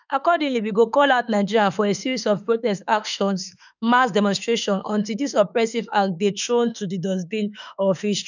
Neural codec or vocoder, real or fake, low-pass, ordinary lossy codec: autoencoder, 48 kHz, 32 numbers a frame, DAC-VAE, trained on Japanese speech; fake; 7.2 kHz; none